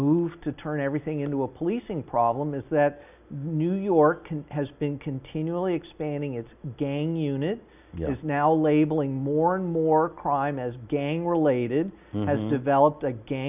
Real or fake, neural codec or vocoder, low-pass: real; none; 3.6 kHz